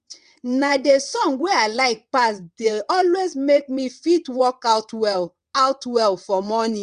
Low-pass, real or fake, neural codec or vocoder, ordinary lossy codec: 9.9 kHz; fake; vocoder, 22.05 kHz, 80 mel bands, WaveNeXt; Opus, 64 kbps